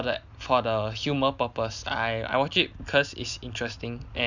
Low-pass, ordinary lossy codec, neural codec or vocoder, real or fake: 7.2 kHz; none; none; real